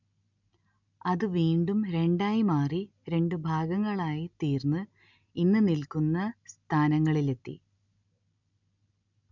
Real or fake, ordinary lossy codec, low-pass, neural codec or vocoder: real; none; 7.2 kHz; none